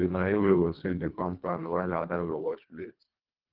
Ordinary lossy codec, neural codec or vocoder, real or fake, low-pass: Opus, 24 kbps; codec, 24 kHz, 1.5 kbps, HILCodec; fake; 5.4 kHz